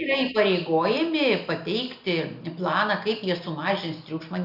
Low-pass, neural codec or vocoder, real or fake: 5.4 kHz; none; real